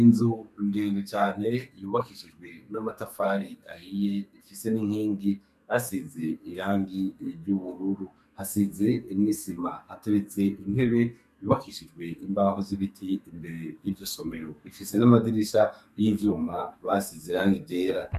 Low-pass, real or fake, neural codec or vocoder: 14.4 kHz; fake; codec, 32 kHz, 1.9 kbps, SNAC